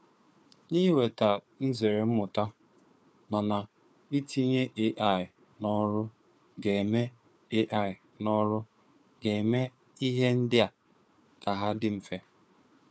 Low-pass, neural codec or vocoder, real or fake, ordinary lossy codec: none; codec, 16 kHz, 4 kbps, FunCodec, trained on Chinese and English, 50 frames a second; fake; none